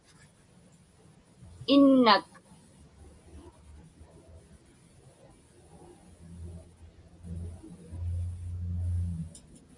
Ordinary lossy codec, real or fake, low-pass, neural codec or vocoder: Opus, 64 kbps; real; 10.8 kHz; none